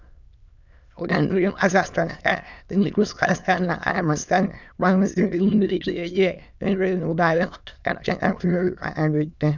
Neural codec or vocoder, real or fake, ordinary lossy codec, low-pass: autoencoder, 22.05 kHz, a latent of 192 numbers a frame, VITS, trained on many speakers; fake; none; 7.2 kHz